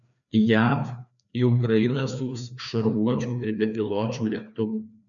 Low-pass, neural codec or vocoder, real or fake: 7.2 kHz; codec, 16 kHz, 2 kbps, FreqCodec, larger model; fake